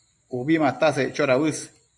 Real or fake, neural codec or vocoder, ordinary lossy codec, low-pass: fake; vocoder, 44.1 kHz, 128 mel bands every 512 samples, BigVGAN v2; MP3, 64 kbps; 10.8 kHz